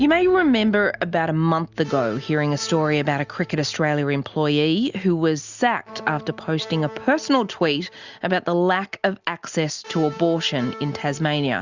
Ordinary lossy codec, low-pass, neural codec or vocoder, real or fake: Opus, 64 kbps; 7.2 kHz; none; real